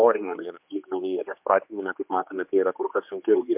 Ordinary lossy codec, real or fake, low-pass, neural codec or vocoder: MP3, 32 kbps; fake; 3.6 kHz; codec, 16 kHz, 2 kbps, X-Codec, HuBERT features, trained on general audio